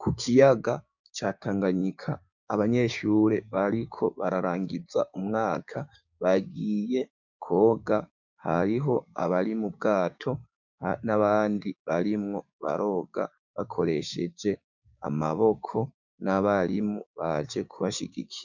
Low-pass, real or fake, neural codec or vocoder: 7.2 kHz; fake; codec, 16 kHz, 6 kbps, DAC